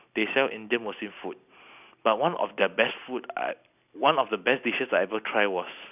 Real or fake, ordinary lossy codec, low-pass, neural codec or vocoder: real; none; 3.6 kHz; none